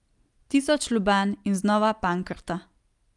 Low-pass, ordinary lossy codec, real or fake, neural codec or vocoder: 10.8 kHz; Opus, 32 kbps; real; none